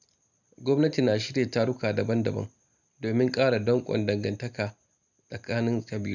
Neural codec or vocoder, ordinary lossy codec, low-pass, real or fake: none; none; 7.2 kHz; real